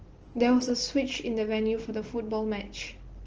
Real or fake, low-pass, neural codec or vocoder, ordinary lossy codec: real; 7.2 kHz; none; Opus, 16 kbps